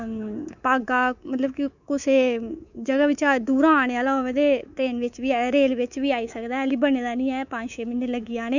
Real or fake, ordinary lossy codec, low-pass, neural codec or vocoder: fake; none; 7.2 kHz; codec, 24 kHz, 3.1 kbps, DualCodec